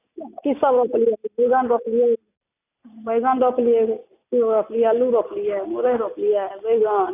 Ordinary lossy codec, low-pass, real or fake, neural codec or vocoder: none; 3.6 kHz; real; none